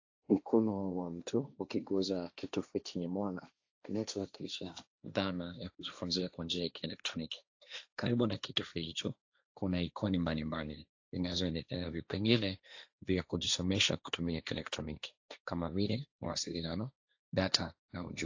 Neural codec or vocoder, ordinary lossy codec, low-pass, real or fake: codec, 16 kHz, 1.1 kbps, Voila-Tokenizer; AAC, 48 kbps; 7.2 kHz; fake